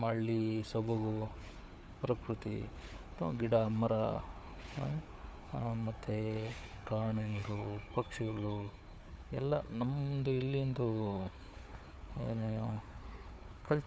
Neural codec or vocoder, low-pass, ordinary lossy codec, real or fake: codec, 16 kHz, 4 kbps, FunCodec, trained on Chinese and English, 50 frames a second; none; none; fake